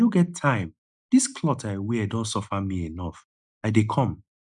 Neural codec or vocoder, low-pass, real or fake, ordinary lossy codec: none; 10.8 kHz; real; none